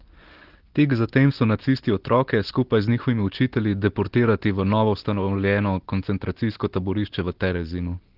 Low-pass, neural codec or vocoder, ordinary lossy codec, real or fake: 5.4 kHz; none; Opus, 16 kbps; real